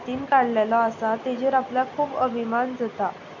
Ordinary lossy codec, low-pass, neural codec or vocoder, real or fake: none; 7.2 kHz; none; real